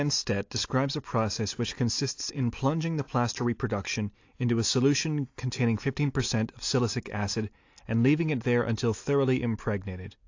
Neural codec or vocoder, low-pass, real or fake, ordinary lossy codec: none; 7.2 kHz; real; AAC, 48 kbps